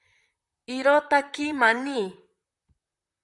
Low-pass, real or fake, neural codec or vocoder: 10.8 kHz; fake; vocoder, 44.1 kHz, 128 mel bands, Pupu-Vocoder